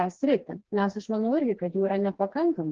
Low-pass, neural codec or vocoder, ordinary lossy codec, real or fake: 7.2 kHz; codec, 16 kHz, 2 kbps, FreqCodec, smaller model; Opus, 16 kbps; fake